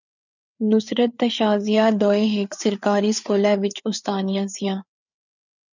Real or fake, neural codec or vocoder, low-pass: fake; codec, 16 kHz, 4 kbps, FreqCodec, larger model; 7.2 kHz